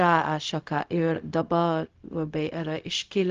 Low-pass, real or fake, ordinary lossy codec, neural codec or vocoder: 7.2 kHz; fake; Opus, 32 kbps; codec, 16 kHz, 0.4 kbps, LongCat-Audio-Codec